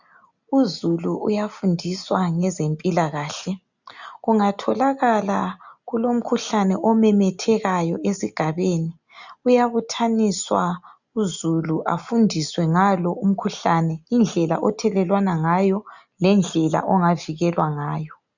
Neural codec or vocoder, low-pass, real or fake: none; 7.2 kHz; real